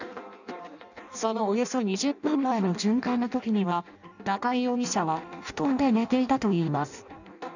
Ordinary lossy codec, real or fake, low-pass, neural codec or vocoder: none; fake; 7.2 kHz; codec, 16 kHz in and 24 kHz out, 0.6 kbps, FireRedTTS-2 codec